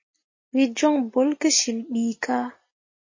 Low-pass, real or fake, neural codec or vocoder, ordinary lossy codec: 7.2 kHz; real; none; MP3, 32 kbps